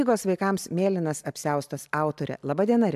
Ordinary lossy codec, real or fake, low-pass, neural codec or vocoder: AAC, 96 kbps; real; 14.4 kHz; none